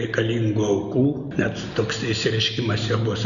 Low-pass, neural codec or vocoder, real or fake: 7.2 kHz; none; real